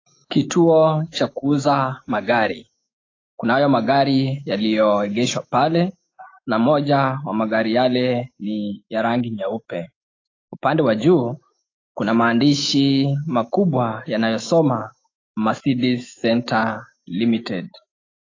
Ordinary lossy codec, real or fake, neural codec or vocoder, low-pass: AAC, 32 kbps; fake; autoencoder, 48 kHz, 128 numbers a frame, DAC-VAE, trained on Japanese speech; 7.2 kHz